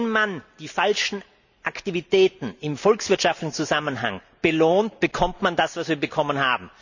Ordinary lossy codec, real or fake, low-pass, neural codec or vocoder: none; real; 7.2 kHz; none